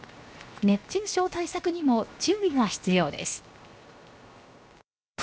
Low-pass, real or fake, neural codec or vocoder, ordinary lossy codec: none; fake; codec, 16 kHz, 0.7 kbps, FocalCodec; none